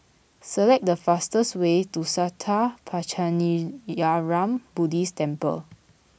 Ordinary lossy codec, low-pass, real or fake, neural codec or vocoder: none; none; real; none